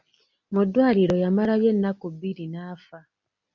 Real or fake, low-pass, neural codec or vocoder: real; 7.2 kHz; none